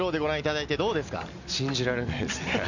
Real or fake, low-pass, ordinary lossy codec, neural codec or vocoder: real; 7.2 kHz; none; none